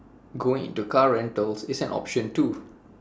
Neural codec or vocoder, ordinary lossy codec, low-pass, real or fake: none; none; none; real